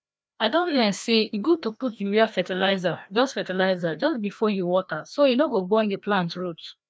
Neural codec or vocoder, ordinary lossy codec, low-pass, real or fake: codec, 16 kHz, 1 kbps, FreqCodec, larger model; none; none; fake